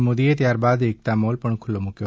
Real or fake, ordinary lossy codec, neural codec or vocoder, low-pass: real; none; none; none